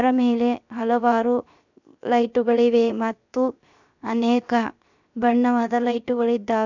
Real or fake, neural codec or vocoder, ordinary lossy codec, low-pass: fake; codec, 16 kHz, 0.7 kbps, FocalCodec; none; 7.2 kHz